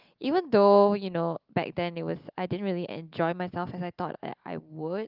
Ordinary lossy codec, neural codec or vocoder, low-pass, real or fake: Opus, 32 kbps; none; 5.4 kHz; real